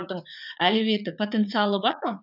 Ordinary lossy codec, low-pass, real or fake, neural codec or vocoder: none; 5.4 kHz; fake; vocoder, 44.1 kHz, 80 mel bands, Vocos